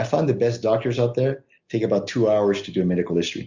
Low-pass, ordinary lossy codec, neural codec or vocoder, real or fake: 7.2 kHz; Opus, 64 kbps; none; real